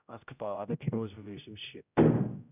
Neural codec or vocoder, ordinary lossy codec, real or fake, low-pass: codec, 16 kHz, 0.5 kbps, X-Codec, HuBERT features, trained on general audio; none; fake; 3.6 kHz